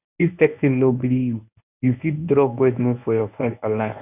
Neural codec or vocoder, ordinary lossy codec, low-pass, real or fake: codec, 24 kHz, 0.9 kbps, WavTokenizer, medium speech release version 1; none; 3.6 kHz; fake